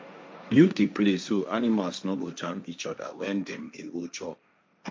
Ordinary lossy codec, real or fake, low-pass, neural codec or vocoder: none; fake; none; codec, 16 kHz, 1.1 kbps, Voila-Tokenizer